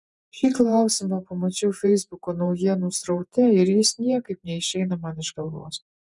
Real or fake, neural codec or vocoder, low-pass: fake; vocoder, 48 kHz, 128 mel bands, Vocos; 10.8 kHz